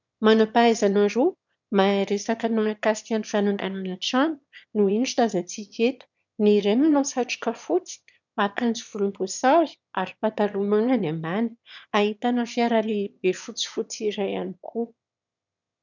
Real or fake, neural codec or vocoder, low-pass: fake; autoencoder, 22.05 kHz, a latent of 192 numbers a frame, VITS, trained on one speaker; 7.2 kHz